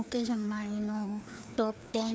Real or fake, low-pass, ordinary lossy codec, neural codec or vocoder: fake; none; none; codec, 16 kHz, 2 kbps, FreqCodec, larger model